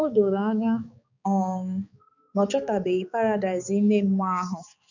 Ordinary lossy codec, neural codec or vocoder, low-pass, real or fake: none; codec, 16 kHz, 4 kbps, X-Codec, HuBERT features, trained on balanced general audio; 7.2 kHz; fake